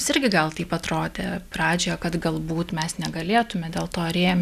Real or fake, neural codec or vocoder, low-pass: real; none; 14.4 kHz